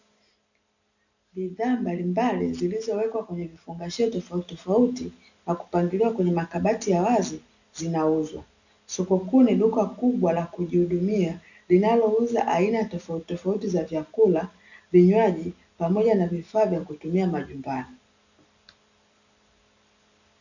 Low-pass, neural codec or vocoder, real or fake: 7.2 kHz; none; real